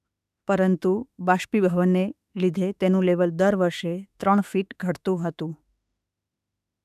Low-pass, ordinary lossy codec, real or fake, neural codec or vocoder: 14.4 kHz; none; fake; autoencoder, 48 kHz, 32 numbers a frame, DAC-VAE, trained on Japanese speech